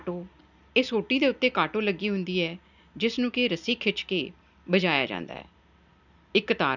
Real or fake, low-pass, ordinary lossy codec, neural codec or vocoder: real; 7.2 kHz; none; none